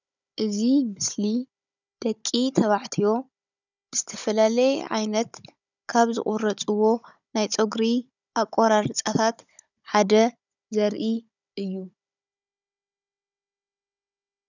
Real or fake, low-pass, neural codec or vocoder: fake; 7.2 kHz; codec, 16 kHz, 16 kbps, FunCodec, trained on Chinese and English, 50 frames a second